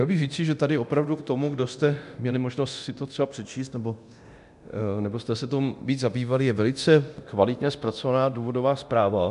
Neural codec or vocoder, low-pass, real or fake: codec, 24 kHz, 0.9 kbps, DualCodec; 10.8 kHz; fake